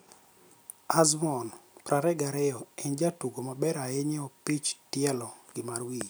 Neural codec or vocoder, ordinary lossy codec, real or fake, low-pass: none; none; real; none